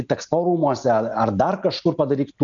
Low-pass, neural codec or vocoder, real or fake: 7.2 kHz; none; real